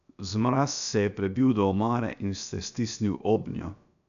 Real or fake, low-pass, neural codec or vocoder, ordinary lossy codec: fake; 7.2 kHz; codec, 16 kHz, 0.7 kbps, FocalCodec; none